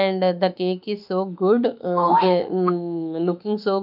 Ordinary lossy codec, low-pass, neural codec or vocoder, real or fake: none; 5.4 kHz; autoencoder, 48 kHz, 32 numbers a frame, DAC-VAE, trained on Japanese speech; fake